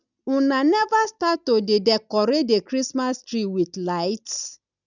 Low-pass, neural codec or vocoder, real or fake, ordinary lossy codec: 7.2 kHz; none; real; none